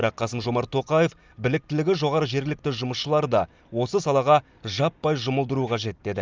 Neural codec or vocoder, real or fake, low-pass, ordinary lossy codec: none; real; 7.2 kHz; Opus, 24 kbps